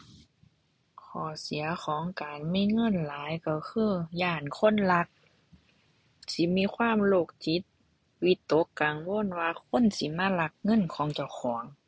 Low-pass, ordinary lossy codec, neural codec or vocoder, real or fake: none; none; none; real